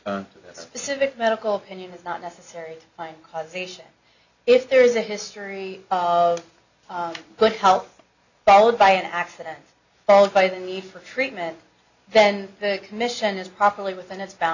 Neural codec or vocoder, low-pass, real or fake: none; 7.2 kHz; real